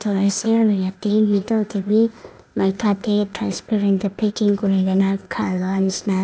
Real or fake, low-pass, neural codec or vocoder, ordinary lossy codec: fake; none; codec, 16 kHz, 0.8 kbps, ZipCodec; none